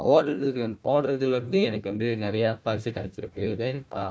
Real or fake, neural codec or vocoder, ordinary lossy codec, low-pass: fake; codec, 16 kHz, 1 kbps, FunCodec, trained on Chinese and English, 50 frames a second; none; none